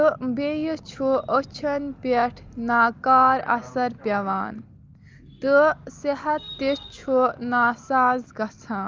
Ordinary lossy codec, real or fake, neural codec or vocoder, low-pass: Opus, 32 kbps; real; none; 7.2 kHz